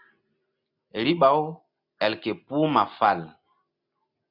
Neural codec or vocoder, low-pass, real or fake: none; 5.4 kHz; real